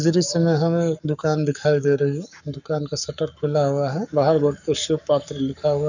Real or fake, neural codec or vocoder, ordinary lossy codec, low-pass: fake; codec, 44.1 kHz, 7.8 kbps, Pupu-Codec; none; 7.2 kHz